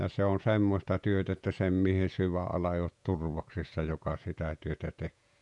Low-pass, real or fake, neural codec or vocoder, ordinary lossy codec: 10.8 kHz; fake; vocoder, 48 kHz, 128 mel bands, Vocos; none